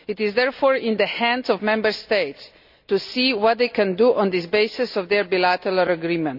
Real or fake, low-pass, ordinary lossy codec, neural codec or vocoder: real; 5.4 kHz; none; none